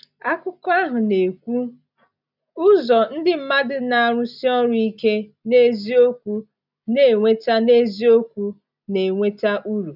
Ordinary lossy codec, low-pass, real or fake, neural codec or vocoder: none; 5.4 kHz; real; none